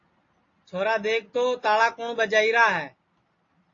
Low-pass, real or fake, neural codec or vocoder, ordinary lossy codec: 7.2 kHz; real; none; AAC, 32 kbps